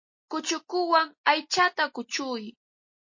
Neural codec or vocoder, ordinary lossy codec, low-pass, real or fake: none; MP3, 32 kbps; 7.2 kHz; real